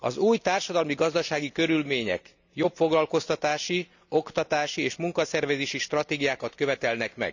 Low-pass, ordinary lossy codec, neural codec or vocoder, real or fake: 7.2 kHz; none; none; real